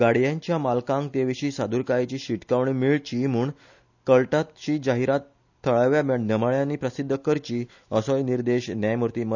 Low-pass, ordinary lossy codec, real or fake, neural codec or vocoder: 7.2 kHz; none; real; none